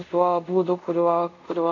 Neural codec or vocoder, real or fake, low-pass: codec, 24 kHz, 0.5 kbps, DualCodec; fake; 7.2 kHz